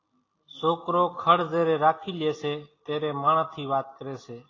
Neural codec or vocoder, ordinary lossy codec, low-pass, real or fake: none; AAC, 32 kbps; 7.2 kHz; real